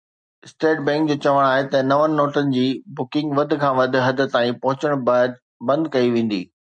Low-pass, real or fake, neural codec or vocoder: 9.9 kHz; real; none